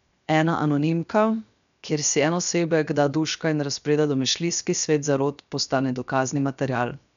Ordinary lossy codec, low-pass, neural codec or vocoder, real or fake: none; 7.2 kHz; codec, 16 kHz, 0.7 kbps, FocalCodec; fake